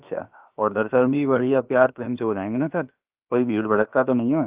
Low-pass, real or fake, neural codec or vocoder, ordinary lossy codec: 3.6 kHz; fake; codec, 16 kHz, about 1 kbps, DyCAST, with the encoder's durations; Opus, 24 kbps